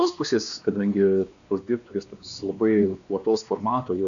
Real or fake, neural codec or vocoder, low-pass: fake; codec, 16 kHz, 2 kbps, X-Codec, WavLM features, trained on Multilingual LibriSpeech; 7.2 kHz